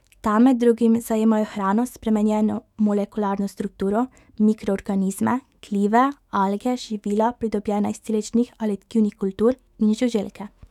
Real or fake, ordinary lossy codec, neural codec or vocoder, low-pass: fake; none; autoencoder, 48 kHz, 128 numbers a frame, DAC-VAE, trained on Japanese speech; 19.8 kHz